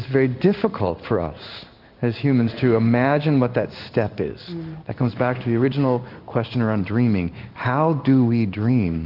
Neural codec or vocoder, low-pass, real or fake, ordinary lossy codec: codec, 16 kHz in and 24 kHz out, 1 kbps, XY-Tokenizer; 5.4 kHz; fake; Opus, 24 kbps